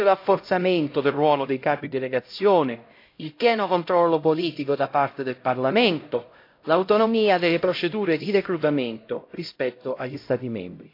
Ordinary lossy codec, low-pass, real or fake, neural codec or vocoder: AAC, 32 kbps; 5.4 kHz; fake; codec, 16 kHz, 0.5 kbps, X-Codec, HuBERT features, trained on LibriSpeech